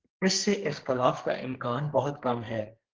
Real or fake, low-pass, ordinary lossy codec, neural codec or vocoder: fake; 7.2 kHz; Opus, 16 kbps; codec, 44.1 kHz, 2.6 kbps, SNAC